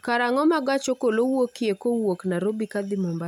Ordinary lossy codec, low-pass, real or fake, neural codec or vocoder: none; 19.8 kHz; real; none